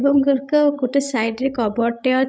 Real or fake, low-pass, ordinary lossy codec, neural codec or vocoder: fake; none; none; codec, 16 kHz, 16 kbps, FreqCodec, larger model